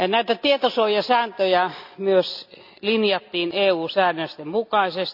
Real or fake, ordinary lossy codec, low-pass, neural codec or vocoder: real; none; 5.4 kHz; none